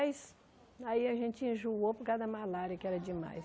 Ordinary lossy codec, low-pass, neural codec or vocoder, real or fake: none; none; none; real